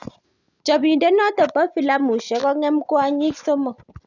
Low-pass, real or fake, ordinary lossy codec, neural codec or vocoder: 7.2 kHz; real; none; none